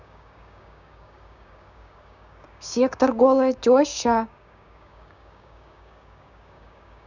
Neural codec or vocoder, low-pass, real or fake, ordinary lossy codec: codec, 16 kHz, 6 kbps, DAC; 7.2 kHz; fake; none